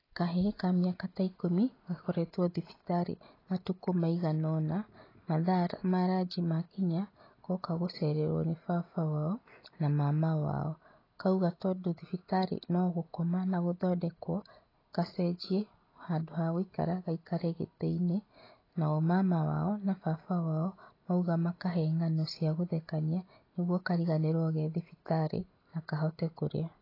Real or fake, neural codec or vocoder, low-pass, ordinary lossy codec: real; none; 5.4 kHz; AAC, 24 kbps